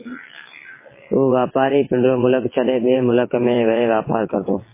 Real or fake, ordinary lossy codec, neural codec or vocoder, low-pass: fake; MP3, 16 kbps; vocoder, 22.05 kHz, 80 mel bands, WaveNeXt; 3.6 kHz